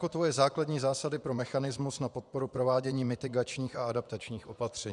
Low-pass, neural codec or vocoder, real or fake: 10.8 kHz; vocoder, 24 kHz, 100 mel bands, Vocos; fake